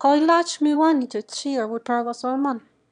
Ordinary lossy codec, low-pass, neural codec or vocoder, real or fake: none; 9.9 kHz; autoencoder, 22.05 kHz, a latent of 192 numbers a frame, VITS, trained on one speaker; fake